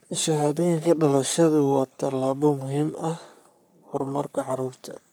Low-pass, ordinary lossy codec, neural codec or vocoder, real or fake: none; none; codec, 44.1 kHz, 3.4 kbps, Pupu-Codec; fake